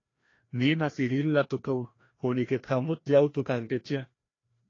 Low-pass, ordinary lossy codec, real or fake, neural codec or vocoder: 7.2 kHz; AAC, 32 kbps; fake; codec, 16 kHz, 1 kbps, FreqCodec, larger model